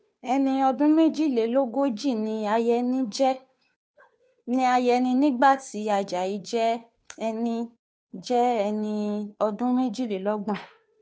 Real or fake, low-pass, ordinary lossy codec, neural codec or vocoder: fake; none; none; codec, 16 kHz, 2 kbps, FunCodec, trained on Chinese and English, 25 frames a second